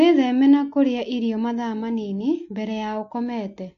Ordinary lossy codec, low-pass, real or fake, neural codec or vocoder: AAC, 48 kbps; 7.2 kHz; real; none